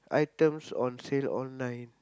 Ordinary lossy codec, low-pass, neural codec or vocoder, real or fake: none; none; none; real